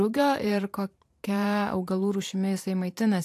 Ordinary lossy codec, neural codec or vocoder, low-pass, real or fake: AAC, 64 kbps; vocoder, 44.1 kHz, 128 mel bands every 512 samples, BigVGAN v2; 14.4 kHz; fake